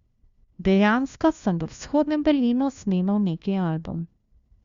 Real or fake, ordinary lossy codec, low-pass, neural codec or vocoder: fake; Opus, 64 kbps; 7.2 kHz; codec, 16 kHz, 1 kbps, FunCodec, trained on LibriTTS, 50 frames a second